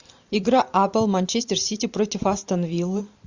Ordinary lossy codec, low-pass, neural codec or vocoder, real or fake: Opus, 64 kbps; 7.2 kHz; vocoder, 44.1 kHz, 128 mel bands every 512 samples, BigVGAN v2; fake